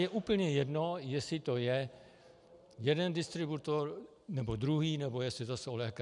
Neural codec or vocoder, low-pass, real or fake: none; 10.8 kHz; real